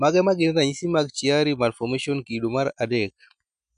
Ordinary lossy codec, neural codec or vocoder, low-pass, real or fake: none; none; 9.9 kHz; real